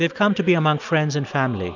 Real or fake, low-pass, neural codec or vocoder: real; 7.2 kHz; none